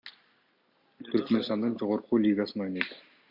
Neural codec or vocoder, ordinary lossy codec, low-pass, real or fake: none; Opus, 64 kbps; 5.4 kHz; real